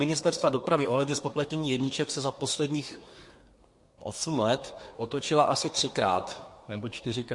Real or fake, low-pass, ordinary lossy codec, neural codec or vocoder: fake; 10.8 kHz; MP3, 48 kbps; codec, 24 kHz, 1 kbps, SNAC